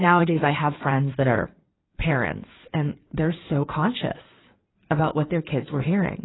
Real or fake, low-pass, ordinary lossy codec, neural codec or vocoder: fake; 7.2 kHz; AAC, 16 kbps; codec, 16 kHz in and 24 kHz out, 2.2 kbps, FireRedTTS-2 codec